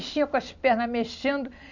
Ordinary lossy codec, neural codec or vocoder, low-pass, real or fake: none; none; 7.2 kHz; real